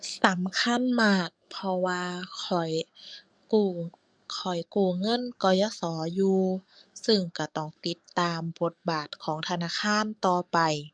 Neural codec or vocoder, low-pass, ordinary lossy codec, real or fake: codec, 44.1 kHz, 7.8 kbps, DAC; 9.9 kHz; none; fake